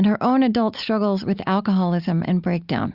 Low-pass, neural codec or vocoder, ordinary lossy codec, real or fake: 5.4 kHz; none; Opus, 64 kbps; real